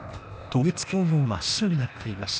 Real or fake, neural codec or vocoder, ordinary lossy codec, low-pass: fake; codec, 16 kHz, 0.8 kbps, ZipCodec; none; none